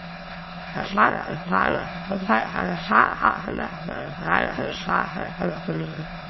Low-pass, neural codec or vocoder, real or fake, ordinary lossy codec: 7.2 kHz; autoencoder, 22.05 kHz, a latent of 192 numbers a frame, VITS, trained on many speakers; fake; MP3, 24 kbps